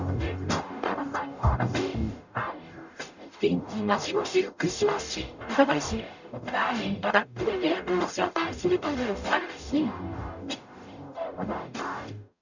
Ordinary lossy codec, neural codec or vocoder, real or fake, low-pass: none; codec, 44.1 kHz, 0.9 kbps, DAC; fake; 7.2 kHz